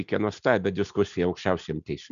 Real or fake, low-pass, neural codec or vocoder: real; 7.2 kHz; none